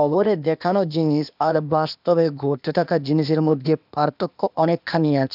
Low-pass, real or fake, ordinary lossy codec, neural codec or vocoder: 5.4 kHz; fake; none; codec, 16 kHz, 0.8 kbps, ZipCodec